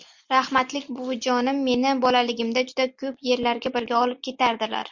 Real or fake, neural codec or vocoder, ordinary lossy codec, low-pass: real; none; MP3, 64 kbps; 7.2 kHz